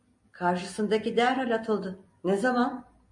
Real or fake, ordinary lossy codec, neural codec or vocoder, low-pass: real; MP3, 48 kbps; none; 10.8 kHz